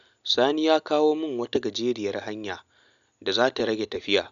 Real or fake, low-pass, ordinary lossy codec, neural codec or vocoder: real; 7.2 kHz; none; none